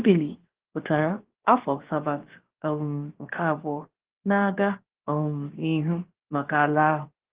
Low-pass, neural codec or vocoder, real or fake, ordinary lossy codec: 3.6 kHz; codec, 16 kHz, 0.7 kbps, FocalCodec; fake; Opus, 16 kbps